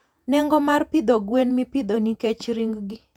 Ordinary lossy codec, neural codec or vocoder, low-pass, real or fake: none; vocoder, 48 kHz, 128 mel bands, Vocos; 19.8 kHz; fake